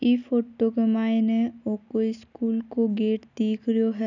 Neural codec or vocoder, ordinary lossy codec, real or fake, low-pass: none; none; real; 7.2 kHz